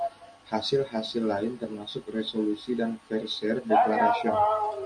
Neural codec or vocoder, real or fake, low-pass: none; real; 9.9 kHz